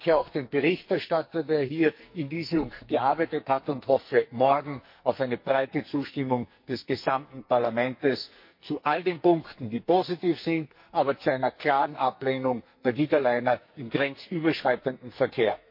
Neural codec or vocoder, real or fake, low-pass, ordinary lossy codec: codec, 44.1 kHz, 2.6 kbps, SNAC; fake; 5.4 kHz; MP3, 32 kbps